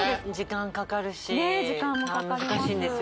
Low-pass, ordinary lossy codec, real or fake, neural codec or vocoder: none; none; real; none